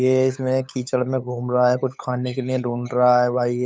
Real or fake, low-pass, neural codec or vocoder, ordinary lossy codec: fake; none; codec, 16 kHz, 8 kbps, FunCodec, trained on LibriTTS, 25 frames a second; none